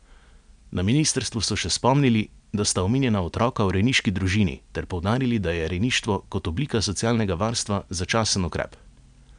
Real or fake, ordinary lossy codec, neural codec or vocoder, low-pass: real; none; none; 9.9 kHz